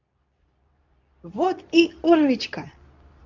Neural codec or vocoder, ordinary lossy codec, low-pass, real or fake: codec, 24 kHz, 0.9 kbps, WavTokenizer, medium speech release version 2; none; 7.2 kHz; fake